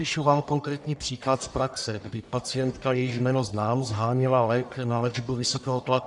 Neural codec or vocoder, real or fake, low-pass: codec, 44.1 kHz, 1.7 kbps, Pupu-Codec; fake; 10.8 kHz